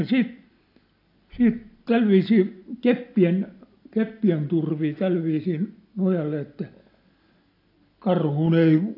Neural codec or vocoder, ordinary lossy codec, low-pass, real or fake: none; AAC, 32 kbps; 5.4 kHz; real